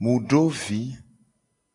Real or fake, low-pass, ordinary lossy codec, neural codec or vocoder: real; 10.8 kHz; AAC, 64 kbps; none